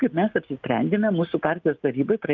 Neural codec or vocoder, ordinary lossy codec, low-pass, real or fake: none; Opus, 32 kbps; 7.2 kHz; real